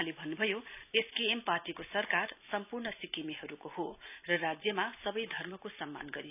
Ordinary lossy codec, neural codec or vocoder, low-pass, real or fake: none; none; 3.6 kHz; real